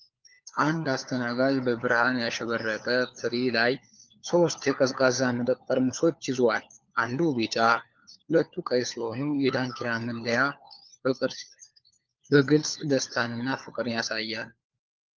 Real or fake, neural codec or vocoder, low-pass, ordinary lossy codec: fake; codec, 16 kHz, 4 kbps, FunCodec, trained on LibriTTS, 50 frames a second; 7.2 kHz; Opus, 24 kbps